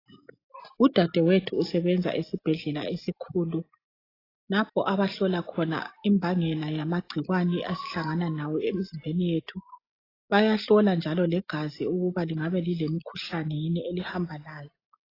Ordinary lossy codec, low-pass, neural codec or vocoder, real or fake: AAC, 24 kbps; 5.4 kHz; none; real